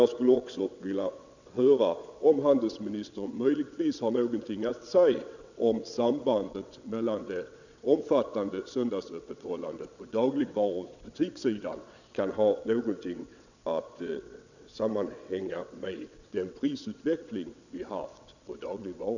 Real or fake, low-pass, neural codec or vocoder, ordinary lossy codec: fake; 7.2 kHz; vocoder, 22.05 kHz, 80 mel bands, WaveNeXt; none